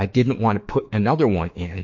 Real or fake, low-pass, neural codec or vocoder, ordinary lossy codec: fake; 7.2 kHz; autoencoder, 48 kHz, 32 numbers a frame, DAC-VAE, trained on Japanese speech; MP3, 48 kbps